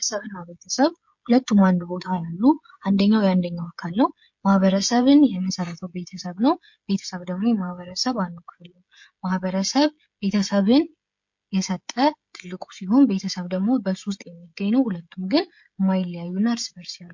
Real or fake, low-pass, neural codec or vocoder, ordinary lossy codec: fake; 7.2 kHz; codec, 16 kHz, 16 kbps, FreqCodec, smaller model; MP3, 48 kbps